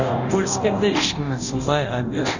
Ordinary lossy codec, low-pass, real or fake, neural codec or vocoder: none; 7.2 kHz; fake; codec, 24 kHz, 0.9 kbps, DualCodec